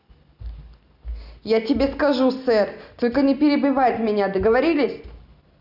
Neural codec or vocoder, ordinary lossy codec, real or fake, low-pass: none; none; real; 5.4 kHz